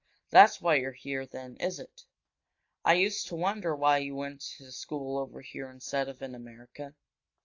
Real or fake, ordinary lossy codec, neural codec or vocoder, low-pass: real; AAC, 48 kbps; none; 7.2 kHz